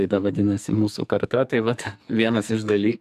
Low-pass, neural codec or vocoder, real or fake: 14.4 kHz; codec, 44.1 kHz, 2.6 kbps, SNAC; fake